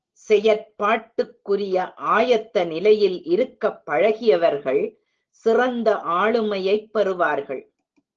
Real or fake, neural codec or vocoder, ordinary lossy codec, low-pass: real; none; Opus, 16 kbps; 7.2 kHz